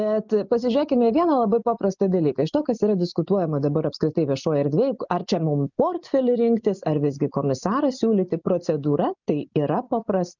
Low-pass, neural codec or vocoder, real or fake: 7.2 kHz; none; real